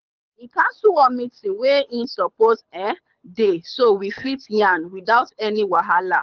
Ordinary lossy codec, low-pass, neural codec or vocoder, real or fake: Opus, 24 kbps; 7.2 kHz; codec, 24 kHz, 6 kbps, HILCodec; fake